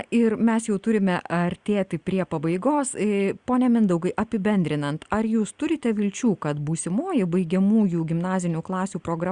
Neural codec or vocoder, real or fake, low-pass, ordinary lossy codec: none; real; 9.9 kHz; Opus, 64 kbps